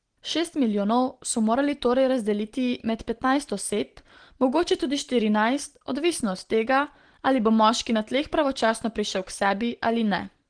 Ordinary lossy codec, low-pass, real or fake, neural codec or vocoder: Opus, 16 kbps; 9.9 kHz; real; none